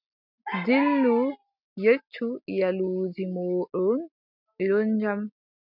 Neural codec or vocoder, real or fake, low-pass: none; real; 5.4 kHz